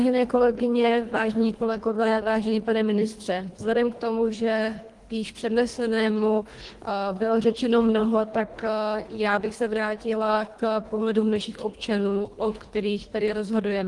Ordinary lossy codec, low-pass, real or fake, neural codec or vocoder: Opus, 24 kbps; 10.8 kHz; fake; codec, 24 kHz, 1.5 kbps, HILCodec